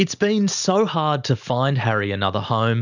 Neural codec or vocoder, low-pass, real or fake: none; 7.2 kHz; real